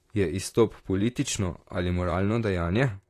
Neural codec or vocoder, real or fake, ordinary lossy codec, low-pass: vocoder, 44.1 kHz, 128 mel bands, Pupu-Vocoder; fake; AAC, 48 kbps; 14.4 kHz